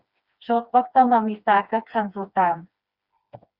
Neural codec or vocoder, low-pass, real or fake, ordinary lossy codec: codec, 16 kHz, 2 kbps, FreqCodec, smaller model; 5.4 kHz; fake; Opus, 64 kbps